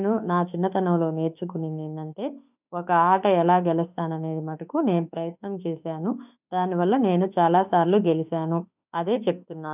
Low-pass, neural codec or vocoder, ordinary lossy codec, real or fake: 3.6 kHz; autoencoder, 48 kHz, 32 numbers a frame, DAC-VAE, trained on Japanese speech; none; fake